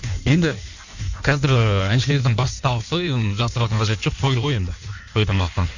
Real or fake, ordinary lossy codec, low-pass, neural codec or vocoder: fake; none; 7.2 kHz; codec, 16 kHz in and 24 kHz out, 1.1 kbps, FireRedTTS-2 codec